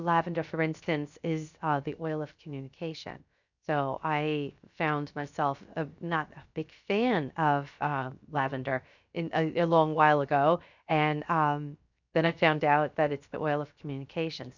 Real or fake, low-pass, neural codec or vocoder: fake; 7.2 kHz; codec, 16 kHz, 0.7 kbps, FocalCodec